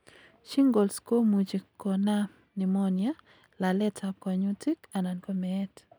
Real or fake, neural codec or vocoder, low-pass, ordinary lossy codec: real; none; none; none